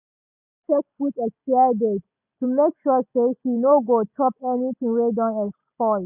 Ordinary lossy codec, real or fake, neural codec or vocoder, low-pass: none; real; none; 3.6 kHz